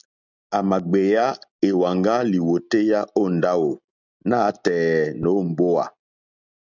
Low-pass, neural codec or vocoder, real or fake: 7.2 kHz; none; real